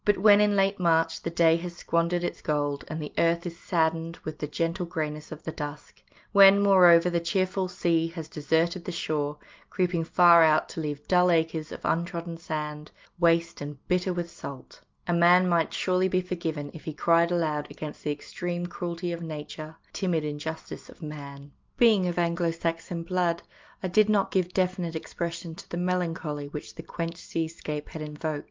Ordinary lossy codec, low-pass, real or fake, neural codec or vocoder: Opus, 24 kbps; 7.2 kHz; real; none